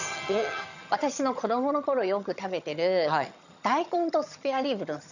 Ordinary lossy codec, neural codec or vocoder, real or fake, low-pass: none; vocoder, 22.05 kHz, 80 mel bands, HiFi-GAN; fake; 7.2 kHz